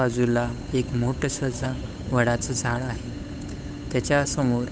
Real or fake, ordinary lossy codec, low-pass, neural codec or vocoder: fake; none; none; codec, 16 kHz, 8 kbps, FunCodec, trained on Chinese and English, 25 frames a second